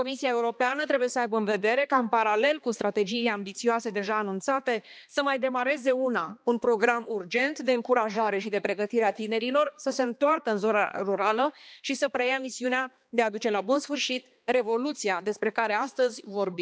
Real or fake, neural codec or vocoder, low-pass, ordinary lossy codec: fake; codec, 16 kHz, 2 kbps, X-Codec, HuBERT features, trained on balanced general audio; none; none